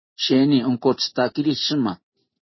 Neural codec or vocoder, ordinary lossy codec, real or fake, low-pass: none; MP3, 24 kbps; real; 7.2 kHz